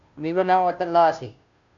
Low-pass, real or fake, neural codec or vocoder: 7.2 kHz; fake; codec, 16 kHz, 0.5 kbps, FunCodec, trained on Chinese and English, 25 frames a second